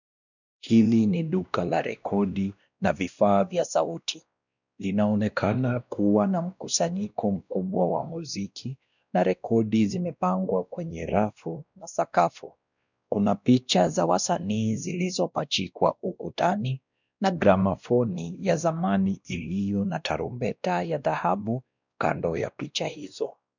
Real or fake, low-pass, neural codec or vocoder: fake; 7.2 kHz; codec, 16 kHz, 1 kbps, X-Codec, WavLM features, trained on Multilingual LibriSpeech